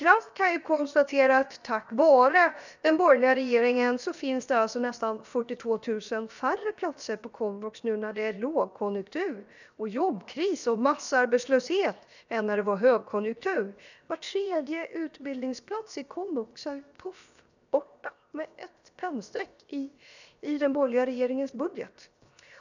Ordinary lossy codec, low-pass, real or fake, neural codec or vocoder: none; 7.2 kHz; fake; codec, 16 kHz, 0.7 kbps, FocalCodec